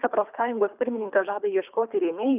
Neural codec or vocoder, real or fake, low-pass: codec, 24 kHz, 3 kbps, HILCodec; fake; 3.6 kHz